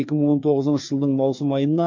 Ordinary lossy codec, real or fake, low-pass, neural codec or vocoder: MP3, 48 kbps; fake; 7.2 kHz; codec, 16 kHz, 2 kbps, FreqCodec, larger model